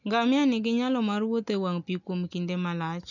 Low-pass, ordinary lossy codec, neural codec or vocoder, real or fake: 7.2 kHz; none; none; real